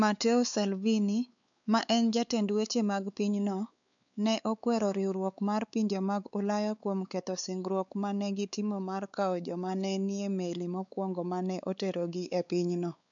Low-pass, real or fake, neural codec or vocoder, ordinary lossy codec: 7.2 kHz; fake; codec, 16 kHz, 4 kbps, X-Codec, WavLM features, trained on Multilingual LibriSpeech; none